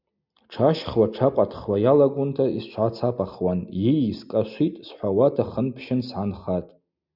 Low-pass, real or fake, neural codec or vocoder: 5.4 kHz; real; none